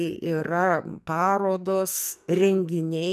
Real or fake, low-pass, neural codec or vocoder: fake; 14.4 kHz; codec, 44.1 kHz, 2.6 kbps, SNAC